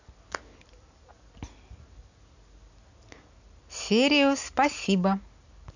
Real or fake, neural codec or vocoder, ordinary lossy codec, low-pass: real; none; none; 7.2 kHz